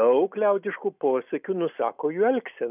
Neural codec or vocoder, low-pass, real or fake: none; 3.6 kHz; real